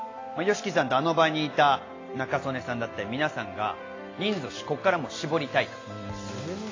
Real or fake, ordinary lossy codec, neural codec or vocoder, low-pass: real; AAC, 32 kbps; none; 7.2 kHz